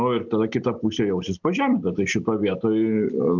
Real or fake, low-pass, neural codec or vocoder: real; 7.2 kHz; none